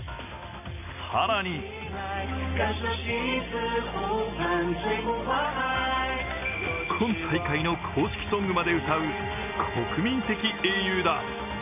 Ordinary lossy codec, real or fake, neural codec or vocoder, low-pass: none; real; none; 3.6 kHz